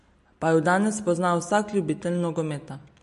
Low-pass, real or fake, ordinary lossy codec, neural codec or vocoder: 14.4 kHz; real; MP3, 48 kbps; none